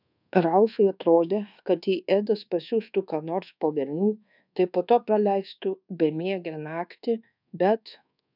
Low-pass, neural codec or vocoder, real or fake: 5.4 kHz; codec, 24 kHz, 1.2 kbps, DualCodec; fake